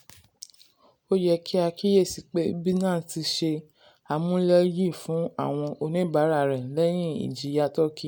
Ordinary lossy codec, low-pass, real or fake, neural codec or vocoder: none; none; real; none